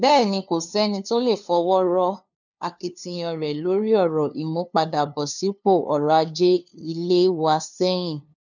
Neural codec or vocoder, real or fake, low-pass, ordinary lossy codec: codec, 16 kHz, 2 kbps, FunCodec, trained on LibriTTS, 25 frames a second; fake; 7.2 kHz; none